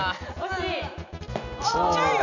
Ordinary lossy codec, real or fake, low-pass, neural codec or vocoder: none; real; 7.2 kHz; none